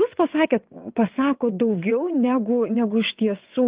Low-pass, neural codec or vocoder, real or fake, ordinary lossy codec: 3.6 kHz; vocoder, 22.05 kHz, 80 mel bands, WaveNeXt; fake; Opus, 32 kbps